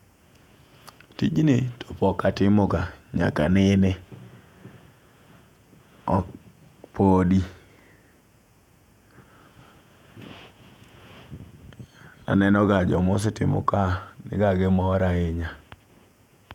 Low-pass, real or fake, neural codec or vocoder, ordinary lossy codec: 19.8 kHz; fake; vocoder, 48 kHz, 128 mel bands, Vocos; none